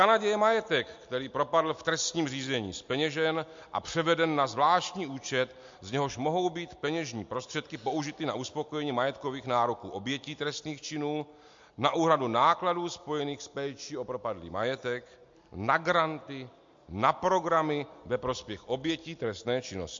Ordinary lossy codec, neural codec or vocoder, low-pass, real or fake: MP3, 48 kbps; none; 7.2 kHz; real